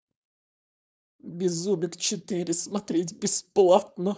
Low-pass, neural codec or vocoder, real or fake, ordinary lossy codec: none; codec, 16 kHz, 4.8 kbps, FACodec; fake; none